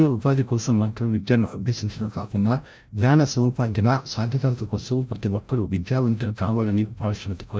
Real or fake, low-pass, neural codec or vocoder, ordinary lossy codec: fake; none; codec, 16 kHz, 0.5 kbps, FreqCodec, larger model; none